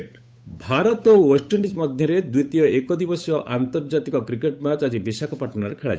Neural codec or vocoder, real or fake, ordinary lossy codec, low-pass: codec, 16 kHz, 8 kbps, FunCodec, trained on Chinese and English, 25 frames a second; fake; none; none